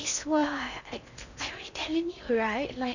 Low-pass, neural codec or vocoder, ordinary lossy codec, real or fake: 7.2 kHz; codec, 16 kHz in and 24 kHz out, 0.6 kbps, FocalCodec, streaming, 4096 codes; none; fake